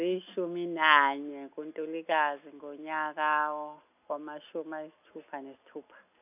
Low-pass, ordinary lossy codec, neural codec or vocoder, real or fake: 3.6 kHz; none; none; real